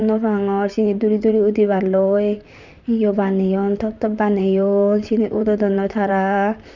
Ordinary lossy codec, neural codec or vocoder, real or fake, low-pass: none; vocoder, 44.1 kHz, 128 mel bands, Pupu-Vocoder; fake; 7.2 kHz